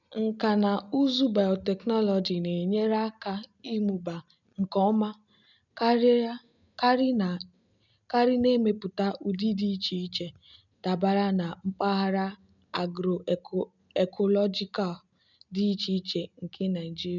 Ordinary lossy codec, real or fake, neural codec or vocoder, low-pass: none; real; none; 7.2 kHz